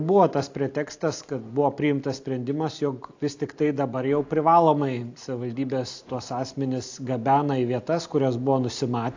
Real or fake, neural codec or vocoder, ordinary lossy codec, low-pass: real; none; AAC, 48 kbps; 7.2 kHz